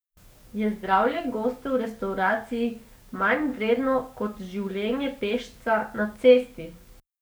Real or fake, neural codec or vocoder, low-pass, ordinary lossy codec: fake; codec, 44.1 kHz, 7.8 kbps, DAC; none; none